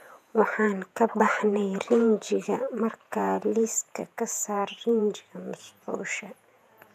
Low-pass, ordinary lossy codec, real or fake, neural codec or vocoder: 14.4 kHz; none; fake; vocoder, 48 kHz, 128 mel bands, Vocos